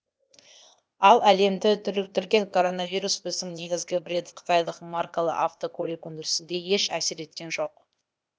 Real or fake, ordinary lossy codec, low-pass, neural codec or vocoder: fake; none; none; codec, 16 kHz, 0.8 kbps, ZipCodec